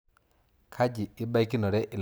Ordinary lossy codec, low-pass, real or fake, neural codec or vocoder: none; none; real; none